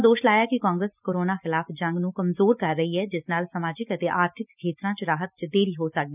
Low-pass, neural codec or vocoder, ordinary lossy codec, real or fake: 3.6 kHz; none; none; real